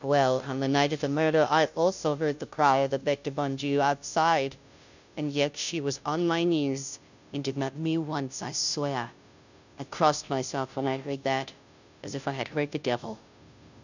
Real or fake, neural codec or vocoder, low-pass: fake; codec, 16 kHz, 0.5 kbps, FunCodec, trained on Chinese and English, 25 frames a second; 7.2 kHz